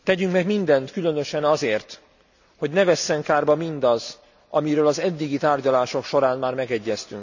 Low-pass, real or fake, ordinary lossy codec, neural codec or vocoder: 7.2 kHz; real; none; none